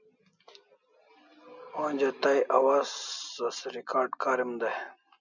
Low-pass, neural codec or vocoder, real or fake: 7.2 kHz; none; real